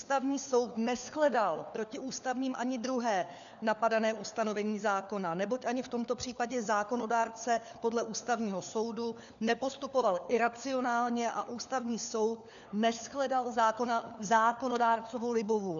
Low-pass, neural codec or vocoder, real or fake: 7.2 kHz; codec, 16 kHz, 4 kbps, FunCodec, trained on LibriTTS, 50 frames a second; fake